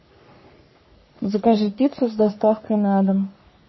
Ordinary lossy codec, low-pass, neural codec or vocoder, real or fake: MP3, 24 kbps; 7.2 kHz; codec, 44.1 kHz, 3.4 kbps, Pupu-Codec; fake